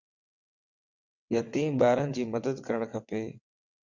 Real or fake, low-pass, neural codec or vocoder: fake; 7.2 kHz; vocoder, 22.05 kHz, 80 mel bands, WaveNeXt